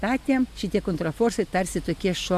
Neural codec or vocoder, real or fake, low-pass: none; real; 14.4 kHz